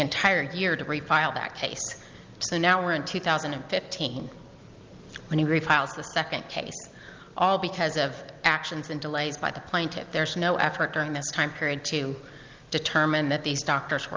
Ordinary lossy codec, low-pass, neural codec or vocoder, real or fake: Opus, 24 kbps; 7.2 kHz; none; real